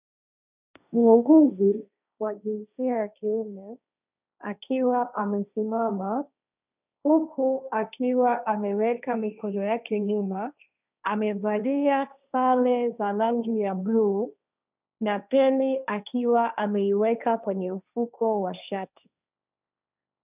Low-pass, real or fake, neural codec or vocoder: 3.6 kHz; fake; codec, 16 kHz, 1.1 kbps, Voila-Tokenizer